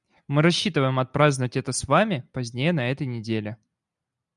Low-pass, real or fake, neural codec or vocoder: 10.8 kHz; real; none